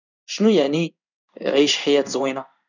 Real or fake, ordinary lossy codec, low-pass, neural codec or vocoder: fake; none; 7.2 kHz; codec, 16 kHz in and 24 kHz out, 1 kbps, XY-Tokenizer